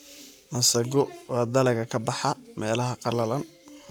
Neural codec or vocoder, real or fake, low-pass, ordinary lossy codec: codec, 44.1 kHz, 7.8 kbps, Pupu-Codec; fake; none; none